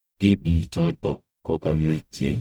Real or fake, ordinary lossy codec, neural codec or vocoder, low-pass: fake; none; codec, 44.1 kHz, 0.9 kbps, DAC; none